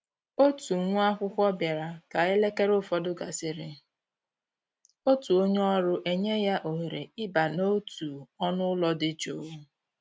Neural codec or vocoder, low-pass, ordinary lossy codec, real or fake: none; none; none; real